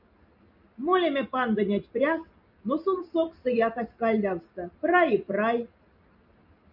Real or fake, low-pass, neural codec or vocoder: real; 5.4 kHz; none